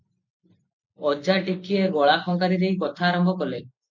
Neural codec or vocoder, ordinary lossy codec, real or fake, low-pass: none; MP3, 48 kbps; real; 7.2 kHz